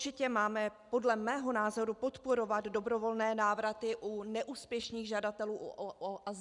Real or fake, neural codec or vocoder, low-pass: real; none; 10.8 kHz